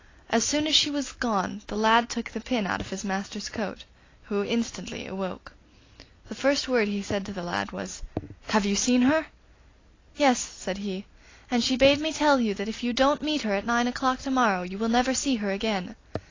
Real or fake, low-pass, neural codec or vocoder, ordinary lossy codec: real; 7.2 kHz; none; AAC, 32 kbps